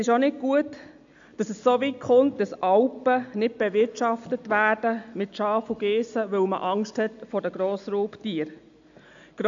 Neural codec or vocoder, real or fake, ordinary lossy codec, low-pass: none; real; AAC, 64 kbps; 7.2 kHz